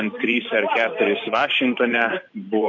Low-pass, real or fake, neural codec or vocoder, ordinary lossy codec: 7.2 kHz; fake; vocoder, 44.1 kHz, 128 mel bands every 512 samples, BigVGAN v2; AAC, 48 kbps